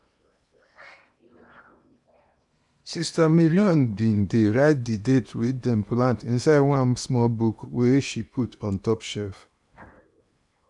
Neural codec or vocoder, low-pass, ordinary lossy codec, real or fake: codec, 16 kHz in and 24 kHz out, 0.8 kbps, FocalCodec, streaming, 65536 codes; 10.8 kHz; none; fake